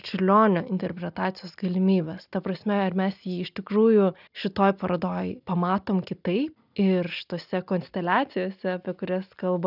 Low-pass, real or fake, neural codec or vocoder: 5.4 kHz; real; none